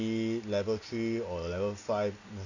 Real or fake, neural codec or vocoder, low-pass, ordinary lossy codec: real; none; 7.2 kHz; none